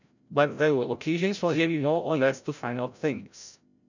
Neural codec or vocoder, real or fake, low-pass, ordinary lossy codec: codec, 16 kHz, 0.5 kbps, FreqCodec, larger model; fake; 7.2 kHz; none